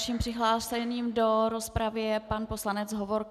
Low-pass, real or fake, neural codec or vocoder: 14.4 kHz; real; none